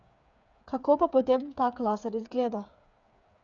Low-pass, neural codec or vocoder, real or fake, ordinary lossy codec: 7.2 kHz; codec, 16 kHz, 8 kbps, FreqCodec, smaller model; fake; none